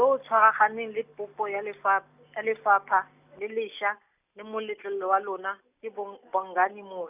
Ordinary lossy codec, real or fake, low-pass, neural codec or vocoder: none; real; 3.6 kHz; none